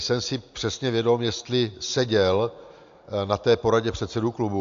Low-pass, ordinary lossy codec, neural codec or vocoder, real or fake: 7.2 kHz; AAC, 64 kbps; none; real